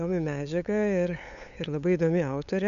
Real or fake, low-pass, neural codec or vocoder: fake; 7.2 kHz; codec, 16 kHz, 8 kbps, FunCodec, trained on LibriTTS, 25 frames a second